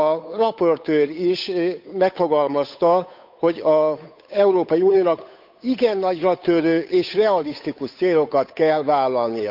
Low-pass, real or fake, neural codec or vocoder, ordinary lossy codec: 5.4 kHz; fake; codec, 16 kHz, 8 kbps, FunCodec, trained on Chinese and English, 25 frames a second; none